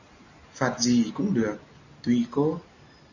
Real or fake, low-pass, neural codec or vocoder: real; 7.2 kHz; none